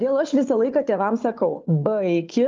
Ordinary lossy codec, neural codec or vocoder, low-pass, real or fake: Opus, 32 kbps; codec, 16 kHz, 4 kbps, FunCodec, trained on Chinese and English, 50 frames a second; 7.2 kHz; fake